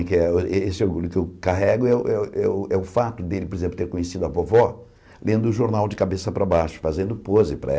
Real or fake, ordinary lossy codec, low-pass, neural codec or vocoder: real; none; none; none